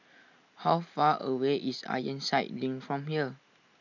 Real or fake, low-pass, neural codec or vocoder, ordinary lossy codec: real; 7.2 kHz; none; none